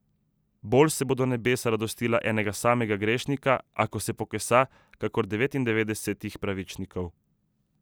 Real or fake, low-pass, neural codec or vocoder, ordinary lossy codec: real; none; none; none